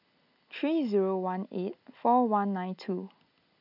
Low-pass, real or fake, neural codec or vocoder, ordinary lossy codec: 5.4 kHz; real; none; none